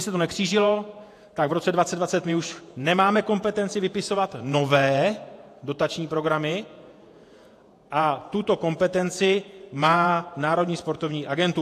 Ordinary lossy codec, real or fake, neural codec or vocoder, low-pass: AAC, 64 kbps; fake; vocoder, 48 kHz, 128 mel bands, Vocos; 14.4 kHz